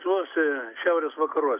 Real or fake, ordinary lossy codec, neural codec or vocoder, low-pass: real; AAC, 24 kbps; none; 3.6 kHz